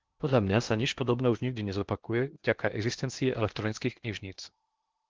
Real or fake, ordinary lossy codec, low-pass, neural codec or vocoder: fake; Opus, 32 kbps; 7.2 kHz; codec, 16 kHz in and 24 kHz out, 0.8 kbps, FocalCodec, streaming, 65536 codes